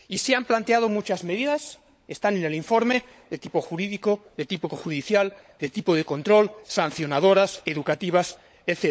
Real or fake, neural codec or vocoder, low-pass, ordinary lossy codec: fake; codec, 16 kHz, 16 kbps, FunCodec, trained on LibriTTS, 50 frames a second; none; none